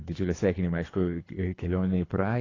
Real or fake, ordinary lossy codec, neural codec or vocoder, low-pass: fake; AAC, 32 kbps; codec, 24 kHz, 3 kbps, HILCodec; 7.2 kHz